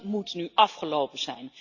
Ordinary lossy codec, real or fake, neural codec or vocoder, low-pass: Opus, 64 kbps; real; none; 7.2 kHz